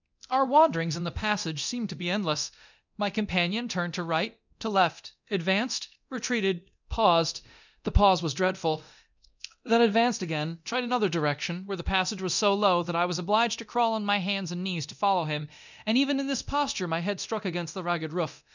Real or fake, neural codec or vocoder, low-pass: fake; codec, 24 kHz, 0.9 kbps, DualCodec; 7.2 kHz